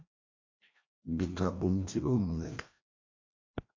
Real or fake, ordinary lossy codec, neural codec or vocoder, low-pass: fake; MP3, 48 kbps; codec, 16 kHz, 1 kbps, FreqCodec, larger model; 7.2 kHz